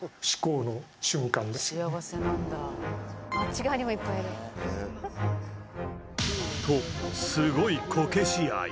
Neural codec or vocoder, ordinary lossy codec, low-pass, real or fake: none; none; none; real